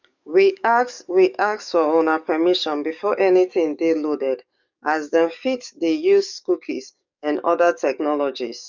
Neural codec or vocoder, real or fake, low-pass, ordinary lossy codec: codec, 44.1 kHz, 7.8 kbps, DAC; fake; 7.2 kHz; none